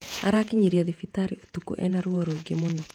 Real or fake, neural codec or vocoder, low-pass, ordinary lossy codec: fake; vocoder, 48 kHz, 128 mel bands, Vocos; 19.8 kHz; none